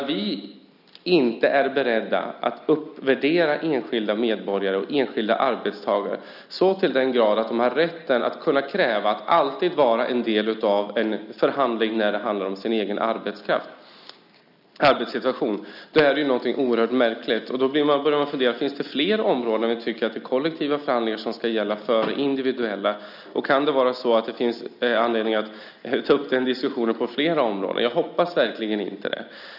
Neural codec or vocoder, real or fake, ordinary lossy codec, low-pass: none; real; none; 5.4 kHz